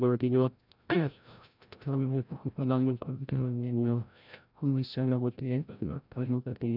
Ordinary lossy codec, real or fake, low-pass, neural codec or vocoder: none; fake; 5.4 kHz; codec, 16 kHz, 0.5 kbps, FreqCodec, larger model